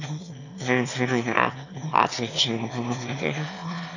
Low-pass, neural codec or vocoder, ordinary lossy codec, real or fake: 7.2 kHz; autoencoder, 22.05 kHz, a latent of 192 numbers a frame, VITS, trained on one speaker; none; fake